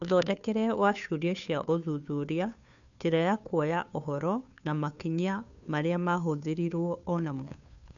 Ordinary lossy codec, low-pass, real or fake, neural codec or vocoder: none; 7.2 kHz; fake; codec, 16 kHz, 2 kbps, FunCodec, trained on Chinese and English, 25 frames a second